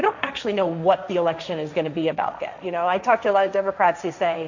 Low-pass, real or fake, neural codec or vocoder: 7.2 kHz; fake; codec, 16 kHz, 1.1 kbps, Voila-Tokenizer